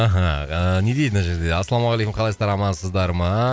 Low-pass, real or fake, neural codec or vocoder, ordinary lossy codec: none; real; none; none